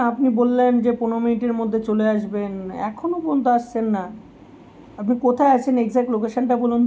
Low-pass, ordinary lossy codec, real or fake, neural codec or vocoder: none; none; real; none